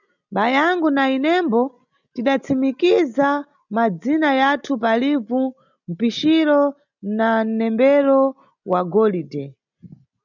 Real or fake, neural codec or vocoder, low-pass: real; none; 7.2 kHz